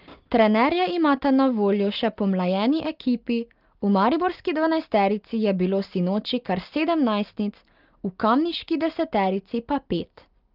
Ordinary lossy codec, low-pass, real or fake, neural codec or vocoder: Opus, 32 kbps; 5.4 kHz; real; none